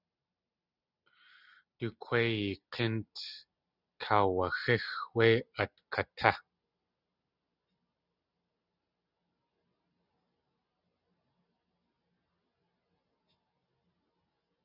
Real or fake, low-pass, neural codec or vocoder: real; 5.4 kHz; none